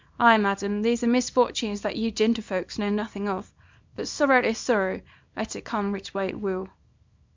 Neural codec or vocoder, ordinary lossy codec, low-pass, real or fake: codec, 24 kHz, 0.9 kbps, WavTokenizer, small release; MP3, 64 kbps; 7.2 kHz; fake